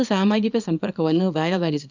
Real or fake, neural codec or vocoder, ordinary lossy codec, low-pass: fake; codec, 24 kHz, 0.9 kbps, WavTokenizer, small release; none; 7.2 kHz